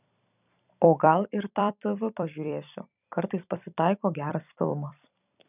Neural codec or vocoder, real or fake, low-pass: vocoder, 44.1 kHz, 128 mel bands every 256 samples, BigVGAN v2; fake; 3.6 kHz